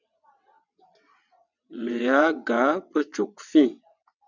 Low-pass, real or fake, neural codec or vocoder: 7.2 kHz; fake; vocoder, 22.05 kHz, 80 mel bands, WaveNeXt